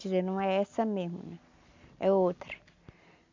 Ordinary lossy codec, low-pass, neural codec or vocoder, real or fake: MP3, 64 kbps; 7.2 kHz; none; real